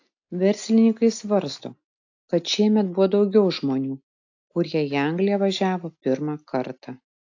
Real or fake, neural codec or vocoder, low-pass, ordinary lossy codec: real; none; 7.2 kHz; AAC, 48 kbps